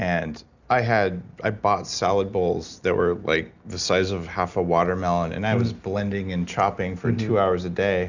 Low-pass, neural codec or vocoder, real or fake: 7.2 kHz; none; real